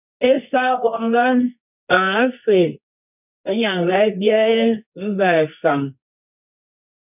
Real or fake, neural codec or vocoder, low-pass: fake; codec, 24 kHz, 0.9 kbps, WavTokenizer, medium music audio release; 3.6 kHz